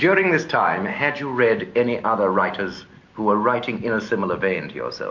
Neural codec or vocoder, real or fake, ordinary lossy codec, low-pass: none; real; MP3, 48 kbps; 7.2 kHz